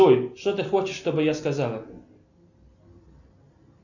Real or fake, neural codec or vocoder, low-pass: real; none; 7.2 kHz